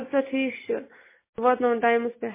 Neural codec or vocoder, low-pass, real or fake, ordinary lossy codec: none; 3.6 kHz; real; MP3, 16 kbps